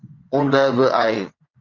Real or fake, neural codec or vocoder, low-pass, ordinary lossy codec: fake; vocoder, 22.05 kHz, 80 mel bands, WaveNeXt; 7.2 kHz; Opus, 64 kbps